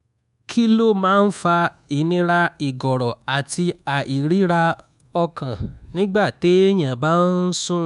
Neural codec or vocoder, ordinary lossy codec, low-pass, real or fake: codec, 24 kHz, 1.2 kbps, DualCodec; none; 10.8 kHz; fake